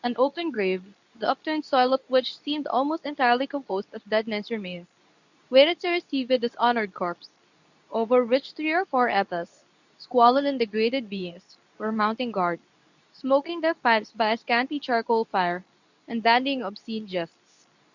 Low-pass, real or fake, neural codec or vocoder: 7.2 kHz; fake; codec, 24 kHz, 0.9 kbps, WavTokenizer, medium speech release version 2